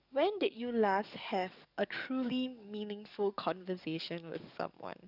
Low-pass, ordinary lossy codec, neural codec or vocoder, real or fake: 5.4 kHz; none; codec, 44.1 kHz, 7.8 kbps, DAC; fake